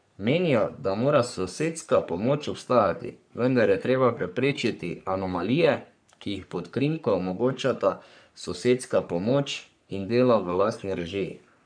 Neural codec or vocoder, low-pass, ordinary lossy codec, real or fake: codec, 44.1 kHz, 3.4 kbps, Pupu-Codec; 9.9 kHz; none; fake